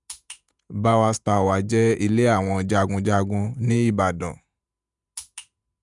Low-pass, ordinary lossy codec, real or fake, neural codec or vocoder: 10.8 kHz; none; fake; vocoder, 48 kHz, 128 mel bands, Vocos